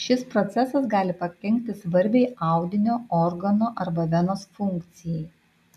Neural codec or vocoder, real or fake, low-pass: none; real; 14.4 kHz